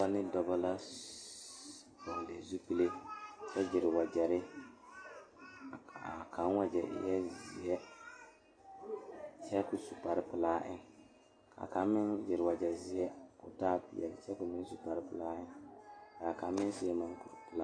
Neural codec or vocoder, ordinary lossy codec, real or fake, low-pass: none; AAC, 32 kbps; real; 9.9 kHz